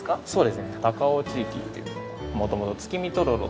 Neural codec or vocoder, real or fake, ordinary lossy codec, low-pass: none; real; none; none